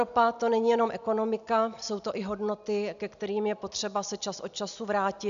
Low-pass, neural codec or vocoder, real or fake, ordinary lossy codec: 7.2 kHz; none; real; AAC, 96 kbps